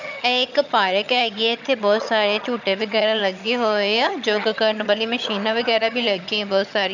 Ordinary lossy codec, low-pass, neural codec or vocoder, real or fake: none; 7.2 kHz; vocoder, 22.05 kHz, 80 mel bands, HiFi-GAN; fake